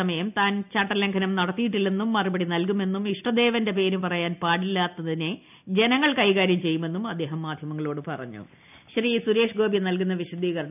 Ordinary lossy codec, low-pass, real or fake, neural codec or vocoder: none; 3.6 kHz; real; none